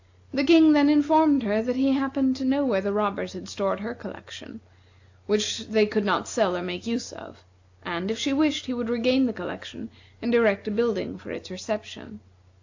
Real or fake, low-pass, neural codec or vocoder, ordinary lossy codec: real; 7.2 kHz; none; AAC, 48 kbps